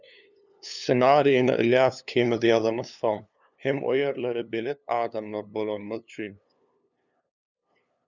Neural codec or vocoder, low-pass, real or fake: codec, 16 kHz, 2 kbps, FunCodec, trained on LibriTTS, 25 frames a second; 7.2 kHz; fake